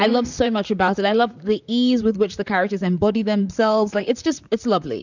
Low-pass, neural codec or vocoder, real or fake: 7.2 kHz; vocoder, 44.1 kHz, 128 mel bands, Pupu-Vocoder; fake